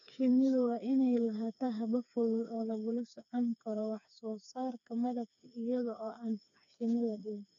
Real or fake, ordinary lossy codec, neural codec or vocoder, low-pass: fake; none; codec, 16 kHz, 4 kbps, FreqCodec, smaller model; 7.2 kHz